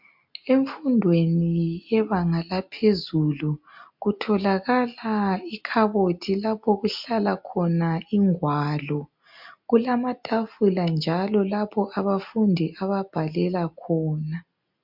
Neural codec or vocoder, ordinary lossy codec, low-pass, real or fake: none; MP3, 48 kbps; 5.4 kHz; real